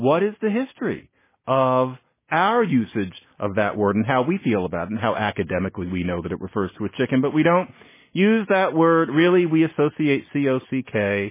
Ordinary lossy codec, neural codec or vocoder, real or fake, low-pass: MP3, 16 kbps; none; real; 3.6 kHz